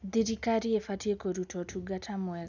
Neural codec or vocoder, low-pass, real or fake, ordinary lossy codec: none; 7.2 kHz; real; none